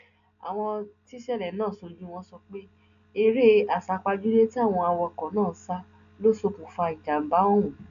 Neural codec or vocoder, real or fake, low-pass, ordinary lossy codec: none; real; 7.2 kHz; none